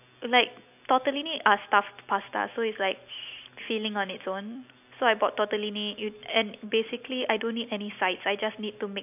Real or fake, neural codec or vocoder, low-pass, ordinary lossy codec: real; none; 3.6 kHz; AAC, 32 kbps